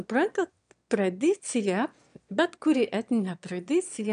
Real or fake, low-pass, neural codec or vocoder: fake; 9.9 kHz; autoencoder, 22.05 kHz, a latent of 192 numbers a frame, VITS, trained on one speaker